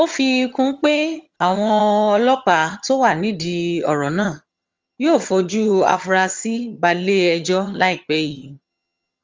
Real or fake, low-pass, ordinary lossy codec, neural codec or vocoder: fake; 7.2 kHz; Opus, 32 kbps; vocoder, 22.05 kHz, 80 mel bands, HiFi-GAN